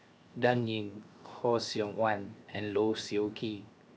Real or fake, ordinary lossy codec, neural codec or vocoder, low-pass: fake; none; codec, 16 kHz, 0.7 kbps, FocalCodec; none